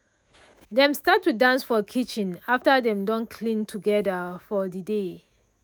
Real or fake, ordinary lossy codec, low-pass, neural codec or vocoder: fake; none; none; autoencoder, 48 kHz, 128 numbers a frame, DAC-VAE, trained on Japanese speech